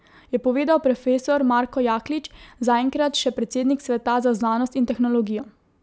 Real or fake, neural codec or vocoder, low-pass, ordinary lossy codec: real; none; none; none